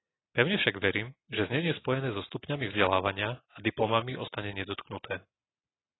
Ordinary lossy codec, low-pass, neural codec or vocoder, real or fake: AAC, 16 kbps; 7.2 kHz; none; real